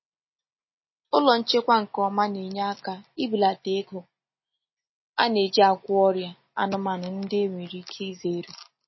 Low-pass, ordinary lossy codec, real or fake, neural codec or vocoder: 7.2 kHz; MP3, 24 kbps; real; none